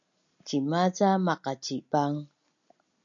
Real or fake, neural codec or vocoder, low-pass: real; none; 7.2 kHz